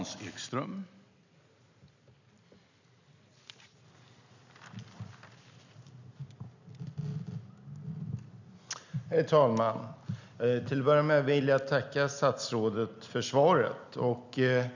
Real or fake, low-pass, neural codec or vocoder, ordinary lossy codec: real; 7.2 kHz; none; none